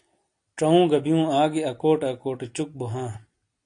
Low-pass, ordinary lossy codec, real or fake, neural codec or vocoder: 9.9 kHz; AAC, 48 kbps; real; none